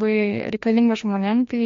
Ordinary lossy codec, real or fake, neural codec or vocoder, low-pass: MP3, 48 kbps; fake; codec, 16 kHz, 1 kbps, FreqCodec, larger model; 7.2 kHz